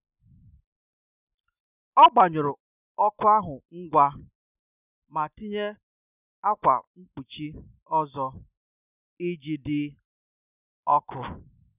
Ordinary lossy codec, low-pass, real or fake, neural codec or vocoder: none; 3.6 kHz; real; none